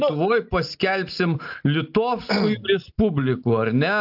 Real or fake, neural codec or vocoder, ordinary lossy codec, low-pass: real; none; AAC, 48 kbps; 5.4 kHz